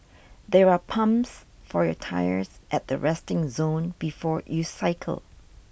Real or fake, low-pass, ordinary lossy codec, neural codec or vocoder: real; none; none; none